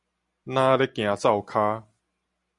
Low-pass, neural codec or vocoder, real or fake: 10.8 kHz; none; real